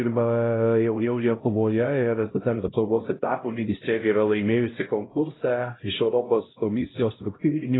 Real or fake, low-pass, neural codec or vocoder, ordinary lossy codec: fake; 7.2 kHz; codec, 16 kHz, 0.5 kbps, X-Codec, HuBERT features, trained on LibriSpeech; AAC, 16 kbps